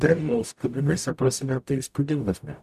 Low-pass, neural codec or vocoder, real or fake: 14.4 kHz; codec, 44.1 kHz, 0.9 kbps, DAC; fake